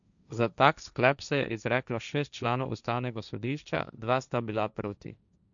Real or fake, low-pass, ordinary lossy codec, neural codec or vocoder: fake; 7.2 kHz; none; codec, 16 kHz, 1.1 kbps, Voila-Tokenizer